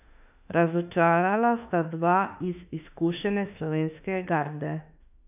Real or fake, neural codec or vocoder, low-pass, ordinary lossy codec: fake; autoencoder, 48 kHz, 32 numbers a frame, DAC-VAE, trained on Japanese speech; 3.6 kHz; none